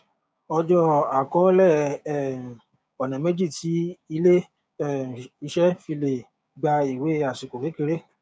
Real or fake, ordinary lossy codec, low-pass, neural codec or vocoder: fake; none; none; codec, 16 kHz, 6 kbps, DAC